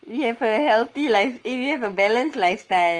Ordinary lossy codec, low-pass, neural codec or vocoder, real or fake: Opus, 24 kbps; 9.9 kHz; codec, 44.1 kHz, 7.8 kbps, Pupu-Codec; fake